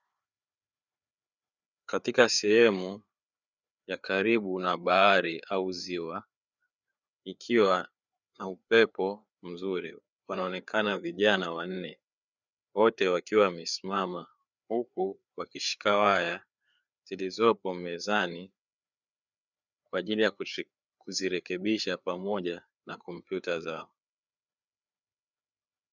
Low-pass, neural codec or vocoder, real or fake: 7.2 kHz; codec, 16 kHz, 4 kbps, FreqCodec, larger model; fake